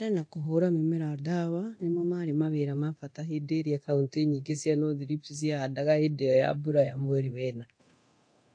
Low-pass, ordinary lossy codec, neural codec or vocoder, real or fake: 9.9 kHz; AAC, 48 kbps; codec, 24 kHz, 0.9 kbps, DualCodec; fake